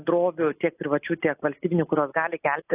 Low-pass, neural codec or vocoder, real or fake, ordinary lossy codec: 3.6 kHz; none; real; AAC, 24 kbps